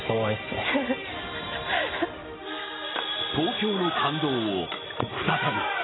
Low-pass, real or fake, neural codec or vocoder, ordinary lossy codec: 7.2 kHz; real; none; AAC, 16 kbps